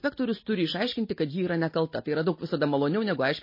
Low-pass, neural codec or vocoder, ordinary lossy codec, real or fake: 5.4 kHz; none; MP3, 24 kbps; real